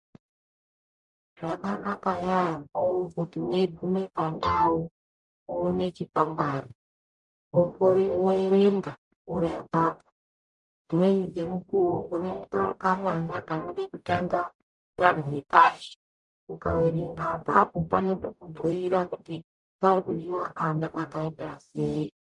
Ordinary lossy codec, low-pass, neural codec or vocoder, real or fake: MP3, 96 kbps; 10.8 kHz; codec, 44.1 kHz, 0.9 kbps, DAC; fake